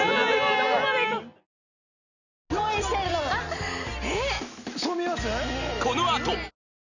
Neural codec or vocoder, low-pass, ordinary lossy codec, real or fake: none; 7.2 kHz; none; real